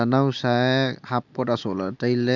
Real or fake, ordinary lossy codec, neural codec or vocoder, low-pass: real; none; none; 7.2 kHz